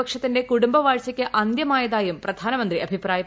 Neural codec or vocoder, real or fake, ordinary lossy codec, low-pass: none; real; none; none